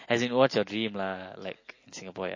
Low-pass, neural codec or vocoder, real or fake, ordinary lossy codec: 7.2 kHz; none; real; MP3, 32 kbps